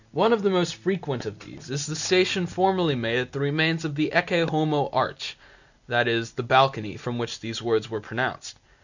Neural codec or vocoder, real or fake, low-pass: none; real; 7.2 kHz